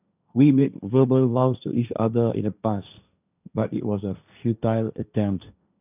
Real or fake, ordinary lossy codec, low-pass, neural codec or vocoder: fake; none; 3.6 kHz; codec, 16 kHz, 1.1 kbps, Voila-Tokenizer